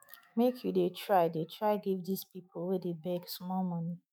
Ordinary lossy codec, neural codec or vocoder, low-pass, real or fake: none; autoencoder, 48 kHz, 128 numbers a frame, DAC-VAE, trained on Japanese speech; none; fake